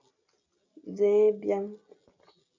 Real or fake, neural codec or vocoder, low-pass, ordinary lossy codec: real; none; 7.2 kHz; MP3, 48 kbps